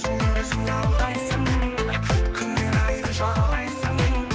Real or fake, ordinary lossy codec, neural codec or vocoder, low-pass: fake; none; codec, 16 kHz, 2 kbps, X-Codec, HuBERT features, trained on general audio; none